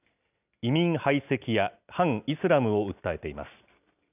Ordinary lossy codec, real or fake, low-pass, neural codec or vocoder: none; real; 3.6 kHz; none